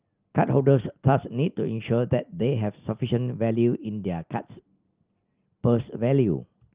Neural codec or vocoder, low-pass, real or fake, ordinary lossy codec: none; 3.6 kHz; real; Opus, 24 kbps